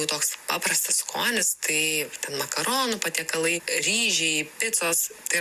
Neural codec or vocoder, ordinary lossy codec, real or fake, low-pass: none; Opus, 64 kbps; real; 14.4 kHz